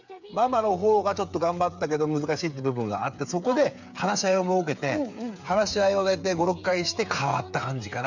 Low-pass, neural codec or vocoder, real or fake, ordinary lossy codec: 7.2 kHz; codec, 16 kHz, 8 kbps, FreqCodec, smaller model; fake; none